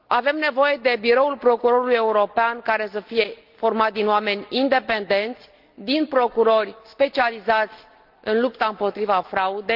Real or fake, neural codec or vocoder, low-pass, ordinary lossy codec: real; none; 5.4 kHz; Opus, 32 kbps